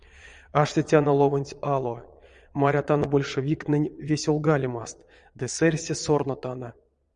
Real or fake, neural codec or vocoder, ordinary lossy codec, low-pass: fake; vocoder, 22.05 kHz, 80 mel bands, Vocos; Opus, 64 kbps; 9.9 kHz